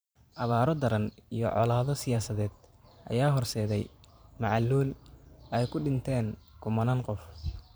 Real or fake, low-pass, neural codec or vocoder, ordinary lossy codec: fake; none; vocoder, 44.1 kHz, 128 mel bands every 512 samples, BigVGAN v2; none